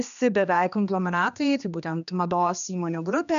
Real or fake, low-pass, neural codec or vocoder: fake; 7.2 kHz; codec, 16 kHz, 2 kbps, X-Codec, HuBERT features, trained on general audio